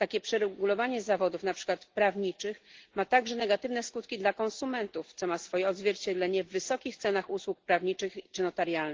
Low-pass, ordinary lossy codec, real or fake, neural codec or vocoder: 7.2 kHz; Opus, 16 kbps; real; none